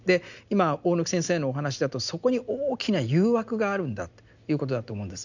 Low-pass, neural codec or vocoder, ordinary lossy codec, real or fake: 7.2 kHz; none; none; real